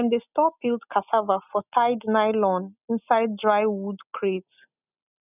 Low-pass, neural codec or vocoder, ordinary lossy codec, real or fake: 3.6 kHz; none; none; real